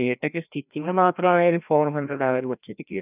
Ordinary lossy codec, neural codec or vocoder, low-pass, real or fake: none; codec, 16 kHz, 1 kbps, FreqCodec, larger model; 3.6 kHz; fake